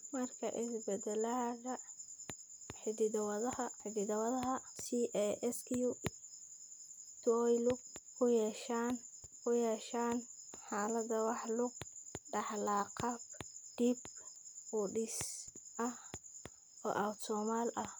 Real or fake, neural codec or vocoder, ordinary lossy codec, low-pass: real; none; none; none